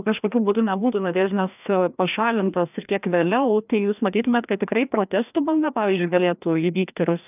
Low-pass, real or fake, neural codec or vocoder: 3.6 kHz; fake; codec, 16 kHz, 1 kbps, FreqCodec, larger model